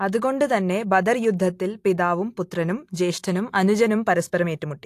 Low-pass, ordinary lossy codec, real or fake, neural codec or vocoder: 14.4 kHz; AAC, 64 kbps; real; none